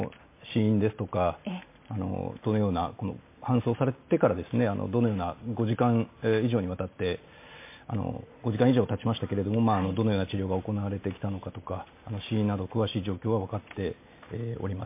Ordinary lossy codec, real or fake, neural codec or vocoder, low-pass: MP3, 24 kbps; real; none; 3.6 kHz